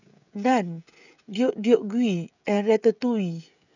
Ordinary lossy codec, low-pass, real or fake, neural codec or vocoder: none; 7.2 kHz; fake; codec, 16 kHz, 16 kbps, FreqCodec, smaller model